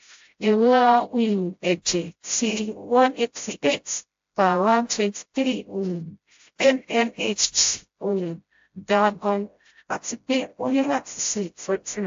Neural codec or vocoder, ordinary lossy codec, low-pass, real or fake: codec, 16 kHz, 0.5 kbps, FreqCodec, smaller model; AAC, 48 kbps; 7.2 kHz; fake